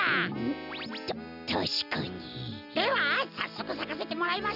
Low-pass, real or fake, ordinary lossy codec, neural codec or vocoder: 5.4 kHz; real; none; none